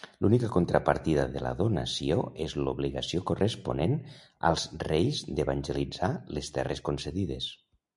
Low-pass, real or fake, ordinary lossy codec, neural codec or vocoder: 10.8 kHz; real; MP3, 96 kbps; none